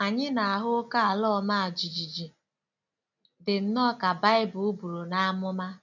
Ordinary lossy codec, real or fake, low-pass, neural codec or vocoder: none; real; 7.2 kHz; none